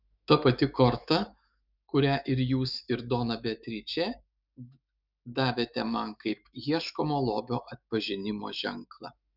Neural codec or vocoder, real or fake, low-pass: autoencoder, 48 kHz, 128 numbers a frame, DAC-VAE, trained on Japanese speech; fake; 5.4 kHz